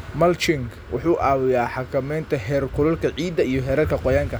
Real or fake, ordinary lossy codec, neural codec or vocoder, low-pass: real; none; none; none